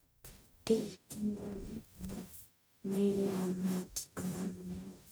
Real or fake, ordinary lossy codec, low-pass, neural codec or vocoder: fake; none; none; codec, 44.1 kHz, 0.9 kbps, DAC